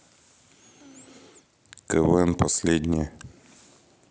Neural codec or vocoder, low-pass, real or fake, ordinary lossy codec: none; none; real; none